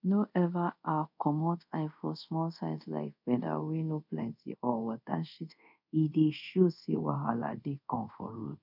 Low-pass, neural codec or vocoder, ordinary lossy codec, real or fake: 5.4 kHz; codec, 24 kHz, 0.5 kbps, DualCodec; none; fake